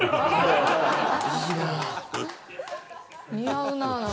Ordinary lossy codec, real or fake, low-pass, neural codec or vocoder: none; real; none; none